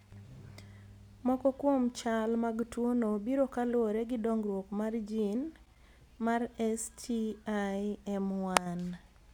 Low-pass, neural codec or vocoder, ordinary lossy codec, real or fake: 19.8 kHz; none; none; real